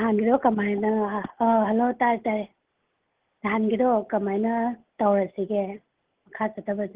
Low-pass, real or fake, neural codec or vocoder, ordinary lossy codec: 3.6 kHz; real; none; Opus, 16 kbps